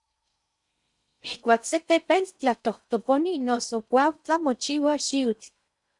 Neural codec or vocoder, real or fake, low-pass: codec, 16 kHz in and 24 kHz out, 0.6 kbps, FocalCodec, streaming, 4096 codes; fake; 10.8 kHz